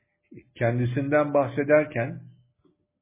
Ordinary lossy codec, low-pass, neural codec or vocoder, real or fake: MP3, 16 kbps; 3.6 kHz; none; real